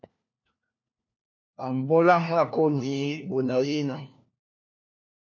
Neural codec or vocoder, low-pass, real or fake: codec, 16 kHz, 1 kbps, FunCodec, trained on LibriTTS, 50 frames a second; 7.2 kHz; fake